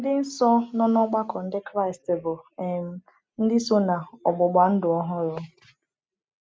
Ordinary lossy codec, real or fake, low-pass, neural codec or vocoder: none; real; none; none